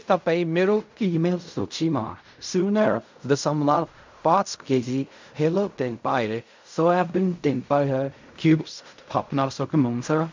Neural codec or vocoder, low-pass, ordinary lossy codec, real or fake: codec, 16 kHz in and 24 kHz out, 0.4 kbps, LongCat-Audio-Codec, fine tuned four codebook decoder; 7.2 kHz; MP3, 64 kbps; fake